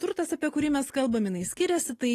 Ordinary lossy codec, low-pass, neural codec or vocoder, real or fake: AAC, 48 kbps; 14.4 kHz; none; real